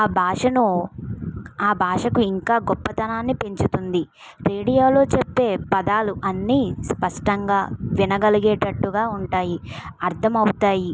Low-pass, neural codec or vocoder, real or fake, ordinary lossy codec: none; none; real; none